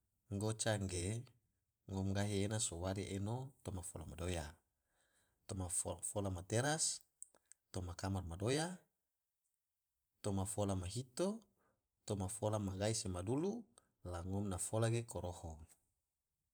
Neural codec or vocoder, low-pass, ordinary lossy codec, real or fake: vocoder, 44.1 kHz, 128 mel bands every 512 samples, BigVGAN v2; none; none; fake